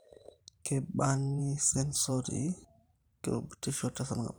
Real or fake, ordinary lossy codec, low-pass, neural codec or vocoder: fake; none; none; vocoder, 44.1 kHz, 128 mel bands every 512 samples, BigVGAN v2